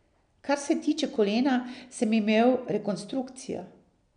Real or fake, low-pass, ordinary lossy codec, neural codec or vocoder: real; 9.9 kHz; none; none